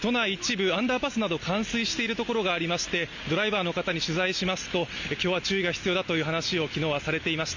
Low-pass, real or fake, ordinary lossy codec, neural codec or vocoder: 7.2 kHz; real; none; none